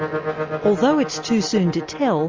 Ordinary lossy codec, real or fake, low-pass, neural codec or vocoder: Opus, 32 kbps; real; 7.2 kHz; none